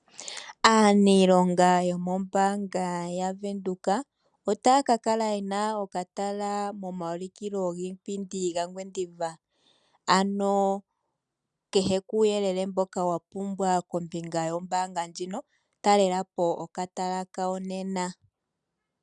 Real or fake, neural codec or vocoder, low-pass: real; none; 10.8 kHz